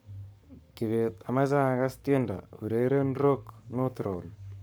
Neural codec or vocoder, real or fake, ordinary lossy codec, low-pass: codec, 44.1 kHz, 7.8 kbps, Pupu-Codec; fake; none; none